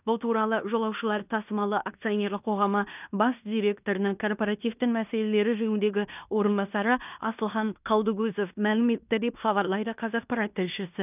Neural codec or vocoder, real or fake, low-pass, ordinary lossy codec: codec, 16 kHz in and 24 kHz out, 0.9 kbps, LongCat-Audio-Codec, fine tuned four codebook decoder; fake; 3.6 kHz; none